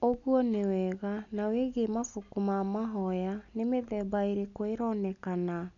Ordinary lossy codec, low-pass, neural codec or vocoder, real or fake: none; 7.2 kHz; none; real